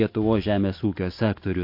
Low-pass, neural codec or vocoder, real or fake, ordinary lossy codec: 5.4 kHz; none; real; MP3, 32 kbps